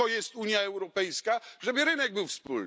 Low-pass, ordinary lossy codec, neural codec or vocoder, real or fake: none; none; none; real